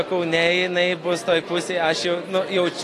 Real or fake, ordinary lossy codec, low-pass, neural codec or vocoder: real; AAC, 48 kbps; 14.4 kHz; none